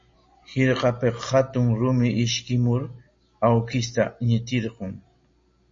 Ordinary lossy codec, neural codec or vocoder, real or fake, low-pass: MP3, 32 kbps; none; real; 7.2 kHz